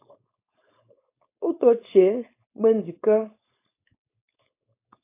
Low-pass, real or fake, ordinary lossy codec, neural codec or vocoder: 3.6 kHz; fake; AAC, 24 kbps; codec, 16 kHz, 4.8 kbps, FACodec